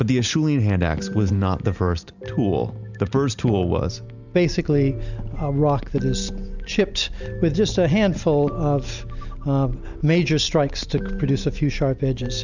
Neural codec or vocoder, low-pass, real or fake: none; 7.2 kHz; real